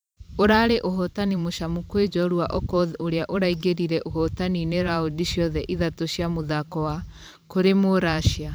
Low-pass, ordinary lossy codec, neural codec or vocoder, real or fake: none; none; vocoder, 44.1 kHz, 128 mel bands every 512 samples, BigVGAN v2; fake